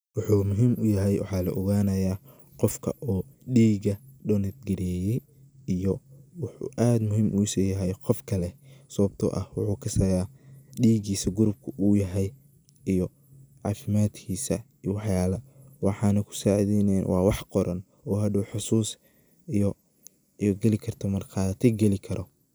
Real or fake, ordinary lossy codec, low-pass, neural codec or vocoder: real; none; none; none